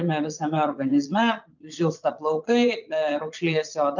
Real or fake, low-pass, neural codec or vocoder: fake; 7.2 kHz; vocoder, 22.05 kHz, 80 mel bands, Vocos